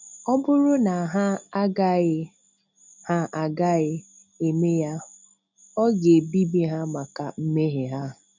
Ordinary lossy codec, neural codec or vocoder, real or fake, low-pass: none; none; real; 7.2 kHz